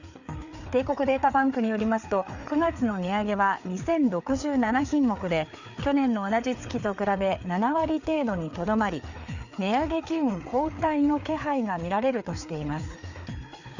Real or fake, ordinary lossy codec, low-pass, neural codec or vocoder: fake; none; 7.2 kHz; codec, 16 kHz, 4 kbps, FreqCodec, larger model